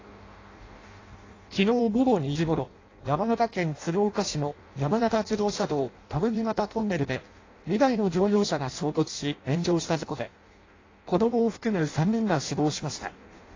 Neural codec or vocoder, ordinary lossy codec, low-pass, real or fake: codec, 16 kHz in and 24 kHz out, 0.6 kbps, FireRedTTS-2 codec; AAC, 32 kbps; 7.2 kHz; fake